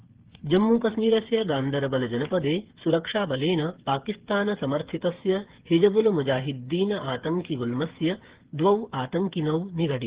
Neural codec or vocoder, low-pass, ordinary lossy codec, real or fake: codec, 16 kHz, 8 kbps, FreqCodec, smaller model; 3.6 kHz; Opus, 16 kbps; fake